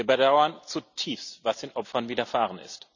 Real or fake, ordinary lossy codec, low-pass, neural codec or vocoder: real; none; 7.2 kHz; none